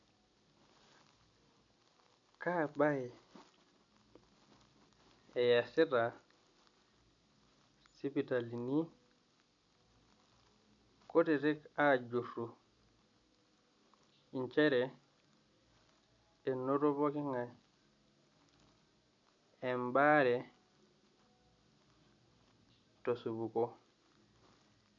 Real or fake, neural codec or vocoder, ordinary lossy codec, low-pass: real; none; none; 7.2 kHz